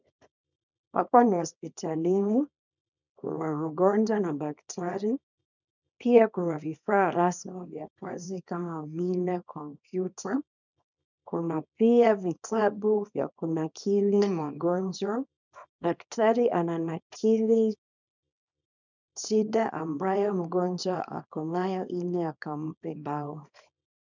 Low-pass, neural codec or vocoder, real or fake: 7.2 kHz; codec, 24 kHz, 0.9 kbps, WavTokenizer, small release; fake